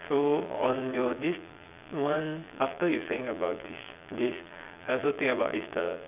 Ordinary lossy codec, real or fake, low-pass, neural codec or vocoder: none; fake; 3.6 kHz; vocoder, 22.05 kHz, 80 mel bands, Vocos